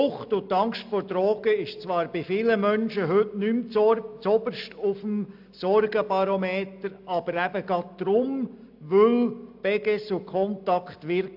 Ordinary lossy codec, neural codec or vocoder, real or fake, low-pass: AAC, 48 kbps; none; real; 5.4 kHz